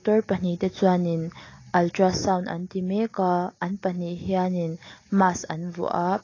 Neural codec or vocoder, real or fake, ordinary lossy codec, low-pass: none; real; AAC, 32 kbps; 7.2 kHz